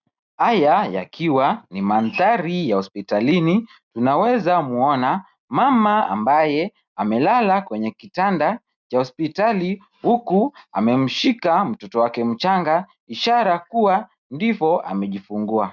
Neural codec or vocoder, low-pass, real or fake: none; 7.2 kHz; real